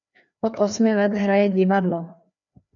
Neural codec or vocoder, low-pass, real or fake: codec, 16 kHz, 2 kbps, FreqCodec, larger model; 7.2 kHz; fake